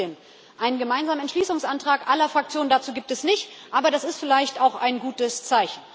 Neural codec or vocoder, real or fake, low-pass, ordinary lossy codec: none; real; none; none